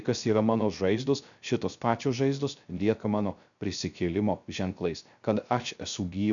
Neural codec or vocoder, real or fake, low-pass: codec, 16 kHz, 0.3 kbps, FocalCodec; fake; 7.2 kHz